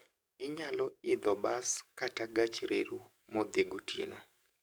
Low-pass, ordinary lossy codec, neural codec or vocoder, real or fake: none; none; codec, 44.1 kHz, 7.8 kbps, DAC; fake